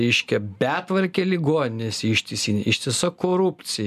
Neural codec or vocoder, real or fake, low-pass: none; real; 14.4 kHz